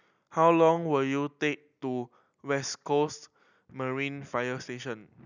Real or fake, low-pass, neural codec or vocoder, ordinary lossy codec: real; 7.2 kHz; none; none